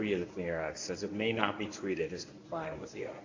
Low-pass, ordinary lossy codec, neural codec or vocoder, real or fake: 7.2 kHz; AAC, 32 kbps; codec, 24 kHz, 0.9 kbps, WavTokenizer, medium speech release version 1; fake